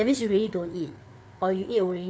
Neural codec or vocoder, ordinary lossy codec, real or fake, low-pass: codec, 16 kHz, 4 kbps, FreqCodec, larger model; none; fake; none